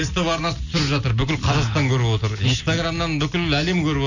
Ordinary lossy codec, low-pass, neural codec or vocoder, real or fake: none; 7.2 kHz; none; real